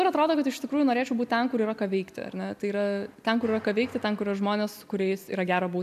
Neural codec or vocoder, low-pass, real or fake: none; 14.4 kHz; real